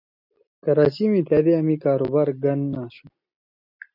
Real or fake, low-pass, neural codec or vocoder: real; 5.4 kHz; none